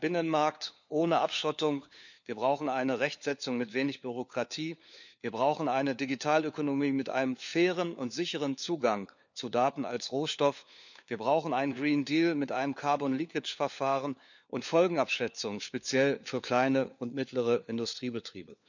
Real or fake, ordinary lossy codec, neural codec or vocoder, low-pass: fake; none; codec, 16 kHz, 4 kbps, FunCodec, trained on LibriTTS, 50 frames a second; 7.2 kHz